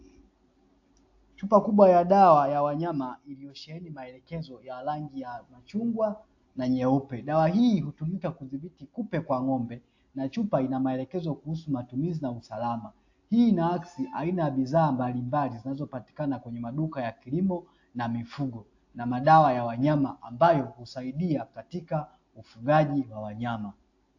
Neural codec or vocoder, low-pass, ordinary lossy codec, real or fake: none; 7.2 kHz; AAC, 48 kbps; real